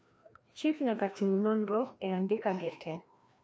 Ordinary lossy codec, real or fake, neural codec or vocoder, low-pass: none; fake; codec, 16 kHz, 1 kbps, FreqCodec, larger model; none